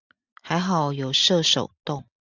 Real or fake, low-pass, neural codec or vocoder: real; 7.2 kHz; none